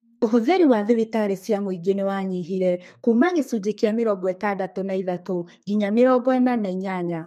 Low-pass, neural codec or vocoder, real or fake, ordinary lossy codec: 14.4 kHz; codec, 32 kHz, 1.9 kbps, SNAC; fake; MP3, 64 kbps